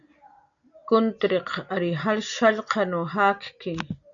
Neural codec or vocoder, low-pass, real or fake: none; 7.2 kHz; real